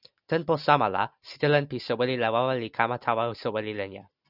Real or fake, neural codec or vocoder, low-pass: real; none; 5.4 kHz